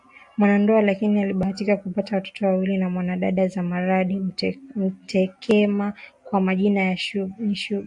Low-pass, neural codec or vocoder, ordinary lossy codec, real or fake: 10.8 kHz; none; AAC, 64 kbps; real